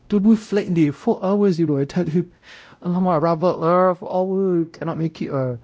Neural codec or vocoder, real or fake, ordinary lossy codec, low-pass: codec, 16 kHz, 0.5 kbps, X-Codec, WavLM features, trained on Multilingual LibriSpeech; fake; none; none